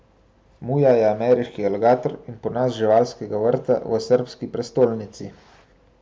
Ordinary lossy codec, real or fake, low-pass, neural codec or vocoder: none; real; none; none